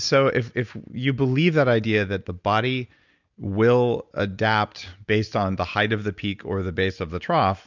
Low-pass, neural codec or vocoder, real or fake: 7.2 kHz; none; real